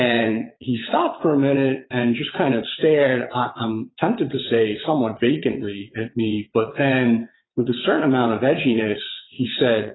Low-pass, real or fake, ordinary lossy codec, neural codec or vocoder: 7.2 kHz; fake; AAC, 16 kbps; vocoder, 22.05 kHz, 80 mel bands, WaveNeXt